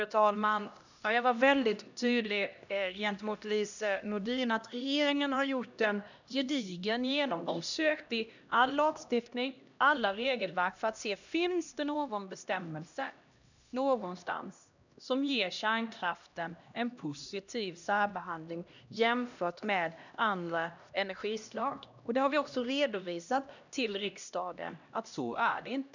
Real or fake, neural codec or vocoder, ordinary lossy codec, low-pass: fake; codec, 16 kHz, 1 kbps, X-Codec, HuBERT features, trained on LibriSpeech; none; 7.2 kHz